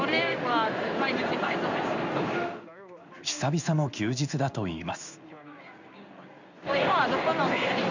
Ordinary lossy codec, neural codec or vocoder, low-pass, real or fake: none; codec, 16 kHz in and 24 kHz out, 1 kbps, XY-Tokenizer; 7.2 kHz; fake